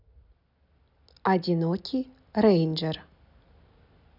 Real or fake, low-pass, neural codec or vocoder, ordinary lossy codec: real; 5.4 kHz; none; none